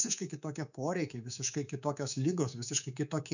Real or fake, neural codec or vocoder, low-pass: fake; codec, 24 kHz, 3.1 kbps, DualCodec; 7.2 kHz